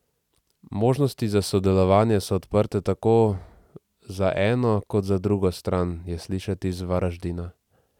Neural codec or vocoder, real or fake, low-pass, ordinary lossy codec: none; real; 19.8 kHz; none